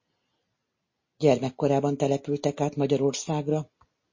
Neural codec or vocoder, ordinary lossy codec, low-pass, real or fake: none; MP3, 32 kbps; 7.2 kHz; real